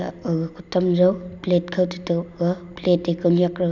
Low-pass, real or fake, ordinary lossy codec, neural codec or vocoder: 7.2 kHz; real; none; none